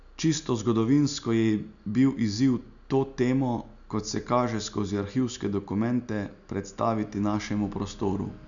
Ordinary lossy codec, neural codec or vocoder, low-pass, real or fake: none; none; 7.2 kHz; real